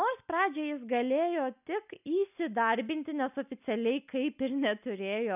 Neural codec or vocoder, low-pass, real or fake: none; 3.6 kHz; real